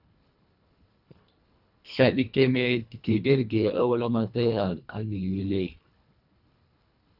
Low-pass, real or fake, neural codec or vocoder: 5.4 kHz; fake; codec, 24 kHz, 1.5 kbps, HILCodec